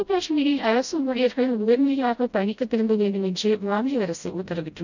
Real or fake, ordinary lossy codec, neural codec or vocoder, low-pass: fake; none; codec, 16 kHz, 0.5 kbps, FreqCodec, smaller model; 7.2 kHz